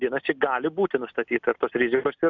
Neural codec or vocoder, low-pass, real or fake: none; 7.2 kHz; real